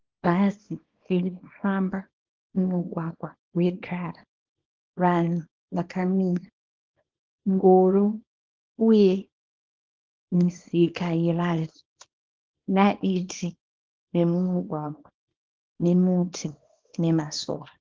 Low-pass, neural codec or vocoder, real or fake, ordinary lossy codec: 7.2 kHz; codec, 24 kHz, 0.9 kbps, WavTokenizer, small release; fake; Opus, 16 kbps